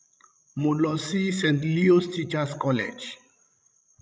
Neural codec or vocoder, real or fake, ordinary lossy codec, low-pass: codec, 16 kHz, 16 kbps, FreqCodec, larger model; fake; none; none